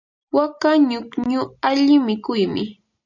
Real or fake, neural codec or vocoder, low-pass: real; none; 7.2 kHz